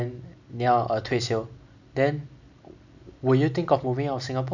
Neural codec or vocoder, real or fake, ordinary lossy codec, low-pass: none; real; none; 7.2 kHz